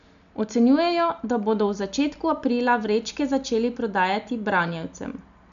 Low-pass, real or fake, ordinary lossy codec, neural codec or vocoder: 7.2 kHz; real; none; none